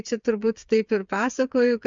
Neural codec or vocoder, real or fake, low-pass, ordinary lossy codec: codec, 16 kHz, 8 kbps, FreqCodec, smaller model; fake; 7.2 kHz; MP3, 64 kbps